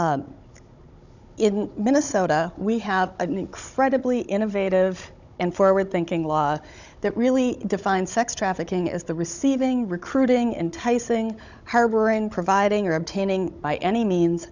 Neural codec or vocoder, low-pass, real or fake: codec, 16 kHz, 16 kbps, FunCodec, trained on Chinese and English, 50 frames a second; 7.2 kHz; fake